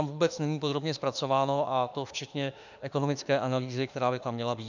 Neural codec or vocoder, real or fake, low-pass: autoencoder, 48 kHz, 32 numbers a frame, DAC-VAE, trained on Japanese speech; fake; 7.2 kHz